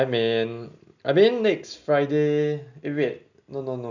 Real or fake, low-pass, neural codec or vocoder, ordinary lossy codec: real; 7.2 kHz; none; none